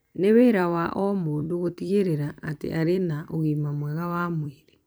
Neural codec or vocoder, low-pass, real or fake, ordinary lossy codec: vocoder, 44.1 kHz, 128 mel bands every 256 samples, BigVGAN v2; none; fake; none